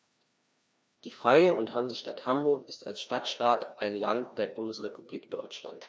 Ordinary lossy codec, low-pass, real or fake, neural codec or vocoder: none; none; fake; codec, 16 kHz, 1 kbps, FreqCodec, larger model